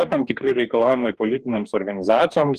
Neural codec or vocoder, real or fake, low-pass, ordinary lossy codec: codec, 44.1 kHz, 2.6 kbps, DAC; fake; 14.4 kHz; Opus, 24 kbps